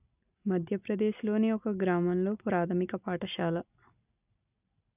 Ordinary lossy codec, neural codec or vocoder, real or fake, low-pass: none; none; real; 3.6 kHz